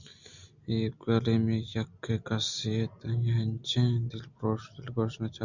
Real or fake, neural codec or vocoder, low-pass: real; none; 7.2 kHz